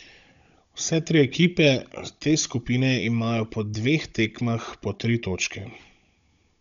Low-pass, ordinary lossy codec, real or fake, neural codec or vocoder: 7.2 kHz; none; fake; codec, 16 kHz, 16 kbps, FunCodec, trained on Chinese and English, 50 frames a second